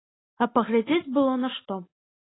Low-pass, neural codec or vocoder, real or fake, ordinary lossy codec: 7.2 kHz; none; real; AAC, 16 kbps